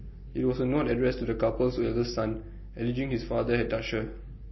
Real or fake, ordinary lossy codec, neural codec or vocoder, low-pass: real; MP3, 24 kbps; none; 7.2 kHz